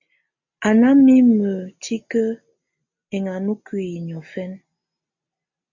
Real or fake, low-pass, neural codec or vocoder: real; 7.2 kHz; none